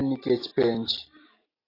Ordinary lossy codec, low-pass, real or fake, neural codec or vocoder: AAC, 24 kbps; 5.4 kHz; real; none